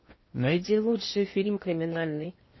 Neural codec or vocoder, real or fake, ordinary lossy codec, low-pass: codec, 16 kHz in and 24 kHz out, 0.6 kbps, FocalCodec, streaming, 4096 codes; fake; MP3, 24 kbps; 7.2 kHz